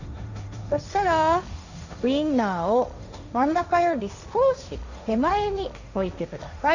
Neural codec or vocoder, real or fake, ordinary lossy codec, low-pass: codec, 16 kHz, 1.1 kbps, Voila-Tokenizer; fake; none; 7.2 kHz